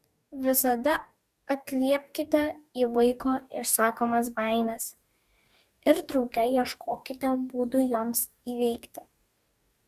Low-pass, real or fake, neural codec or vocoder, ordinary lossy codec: 14.4 kHz; fake; codec, 44.1 kHz, 2.6 kbps, DAC; Opus, 64 kbps